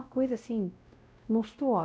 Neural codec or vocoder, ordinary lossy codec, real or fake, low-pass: codec, 16 kHz, 0.5 kbps, X-Codec, WavLM features, trained on Multilingual LibriSpeech; none; fake; none